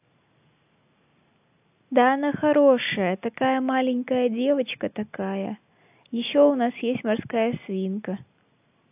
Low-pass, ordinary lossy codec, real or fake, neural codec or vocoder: 3.6 kHz; AAC, 32 kbps; real; none